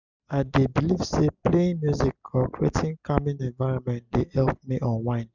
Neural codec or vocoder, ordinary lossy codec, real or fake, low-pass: vocoder, 44.1 kHz, 128 mel bands every 256 samples, BigVGAN v2; none; fake; 7.2 kHz